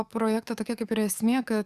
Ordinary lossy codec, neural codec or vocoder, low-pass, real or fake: Opus, 64 kbps; codec, 44.1 kHz, 7.8 kbps, DAC; 14.4 kHz; fake